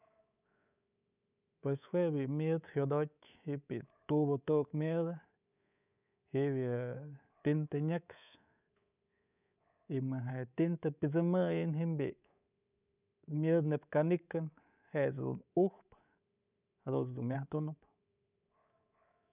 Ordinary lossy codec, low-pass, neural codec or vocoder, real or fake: none; 3.6 kHz; codec, 24 kHz, 3.1 kbps, DualCodec; fake